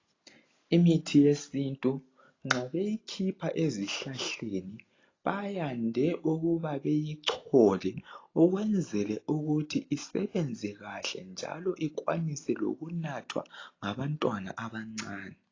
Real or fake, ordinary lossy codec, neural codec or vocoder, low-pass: real; AAC, 32 kbps; none; 7.2 kHz